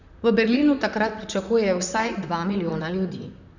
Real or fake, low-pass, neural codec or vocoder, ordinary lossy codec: fake; 7.2 kHz; vocoder, 44.1 kHz, 128 mel bands, Pupu-Vocoder; none